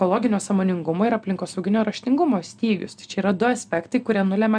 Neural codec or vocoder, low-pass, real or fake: none; 9.9 kHz; real